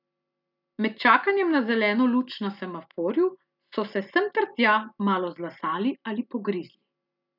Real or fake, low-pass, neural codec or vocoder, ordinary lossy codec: real; 5.4 kHz; none; none